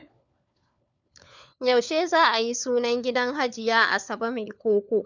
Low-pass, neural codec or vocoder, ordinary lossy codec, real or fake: 7.2 kHz; codec, 16 kHz, 4 kbps, FunCodec, trained on LibriTTS, 50 frames a second; none; fake